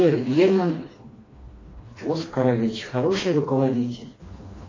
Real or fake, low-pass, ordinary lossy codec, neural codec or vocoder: fake; 7.2 kHz; AAC, 32 kbps; codec, 16 kHz, 2 kbps, FreqCodec, smaller model